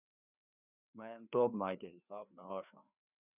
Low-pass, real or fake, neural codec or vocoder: 3.6 kHz; fake; codec, 16 kHz, 2 kbps, FreqCodec, larger model